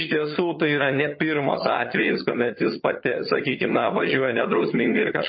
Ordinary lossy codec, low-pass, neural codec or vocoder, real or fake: MP3, 24 kbps; 7.2 kHz; vocoder, 22.05 kHz, 80 mel bands, HiFi-GAN; fake